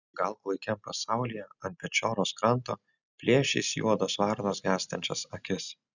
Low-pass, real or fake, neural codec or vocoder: 7.2 kHz; real; none